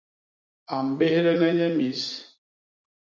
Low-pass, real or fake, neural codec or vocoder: 7.2 kHz; fake; vocoder, 44.1 kHz, 80 mel bands, Vocos